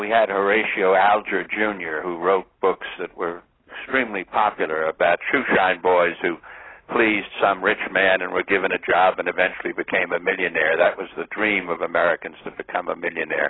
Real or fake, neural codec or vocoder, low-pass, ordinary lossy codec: real; none; 7.2 kHz; AAC, 16 kbps